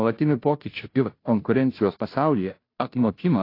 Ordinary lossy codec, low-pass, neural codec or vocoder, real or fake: AAC, 32 kbps; 5.4 kHz; codec, 16 kHz, 0.5 kbps, FunCodec, trained on Chinese and English, 25 frames a second; fake